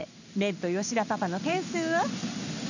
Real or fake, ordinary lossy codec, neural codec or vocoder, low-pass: fake; none; codec, 16 kHz in and 24 kHz out, 1 kbps, XY-Tokenizer; 7.2 kHz